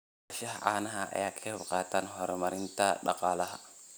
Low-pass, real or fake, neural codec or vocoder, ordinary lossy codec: none; real; none; none